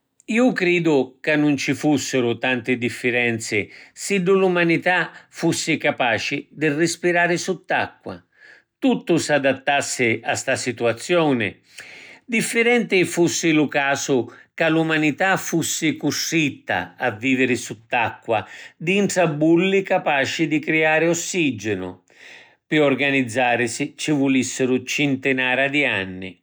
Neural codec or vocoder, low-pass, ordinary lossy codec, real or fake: none; none; none; real